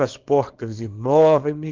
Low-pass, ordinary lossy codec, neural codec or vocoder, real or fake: 7.2 kHz; Opus, 16 kbps; codec, 24 kHz, 0.9 kbps, WavTokenizer, small release; fake